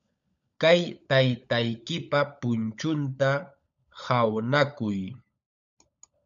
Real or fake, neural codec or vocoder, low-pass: fake; codec, 16 kHz, 16 kbps, FunCodec, trained on LibriTTS, 50 frames a second; 7.2 kHz